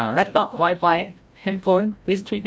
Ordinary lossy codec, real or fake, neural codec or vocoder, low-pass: none; fake; codec, 16 kHz, 0.5 kbps, FreqCodec, larger model; none